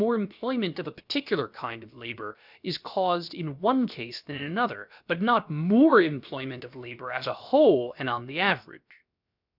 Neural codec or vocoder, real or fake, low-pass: codec, 16 kHz, about 1 kbps, DyCAST, with the encoder's durations; fake; 5.4 kHz